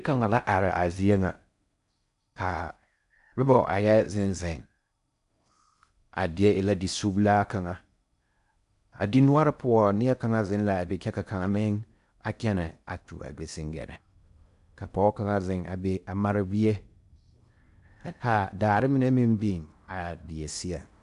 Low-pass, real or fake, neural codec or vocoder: 10.8 kHz; fake; codec, 16 kHz in and 24 kHz out, 0.6 kbps, FocalCodec, streaming, 4096 codes